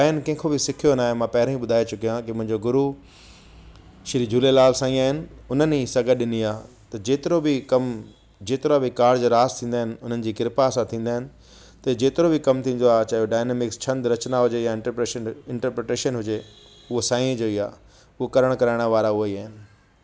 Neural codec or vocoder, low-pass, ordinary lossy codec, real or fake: none; none; none; real